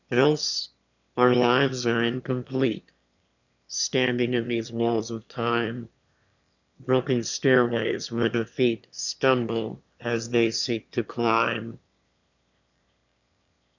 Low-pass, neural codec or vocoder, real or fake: 7.2 kHz; autoencoder, 22.05 kHz, a latent of 192 numbers a frame, VITS, trained on one speaker; fake